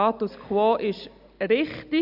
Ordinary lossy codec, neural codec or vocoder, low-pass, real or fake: none; none; 5.4 kHz; real